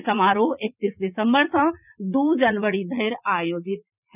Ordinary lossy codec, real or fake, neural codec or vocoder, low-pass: none; fake; vocoder, 44.1 kHz, 80 mel bands, Vocos; 3.6 kHz